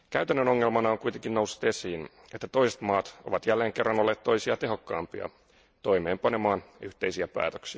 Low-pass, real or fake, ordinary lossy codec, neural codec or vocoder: none; real; none; none